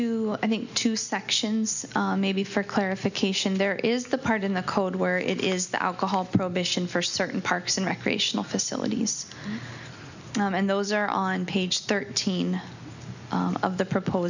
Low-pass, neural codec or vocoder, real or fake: 7.2 kHz; none; real